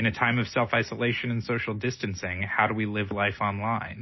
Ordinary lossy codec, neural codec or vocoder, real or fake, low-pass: MP3, 24 kbps; none; real; 7.2 kHz